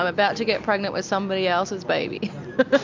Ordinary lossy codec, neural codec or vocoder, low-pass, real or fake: MP3, 64 kbps; none; 7.2 kHz; real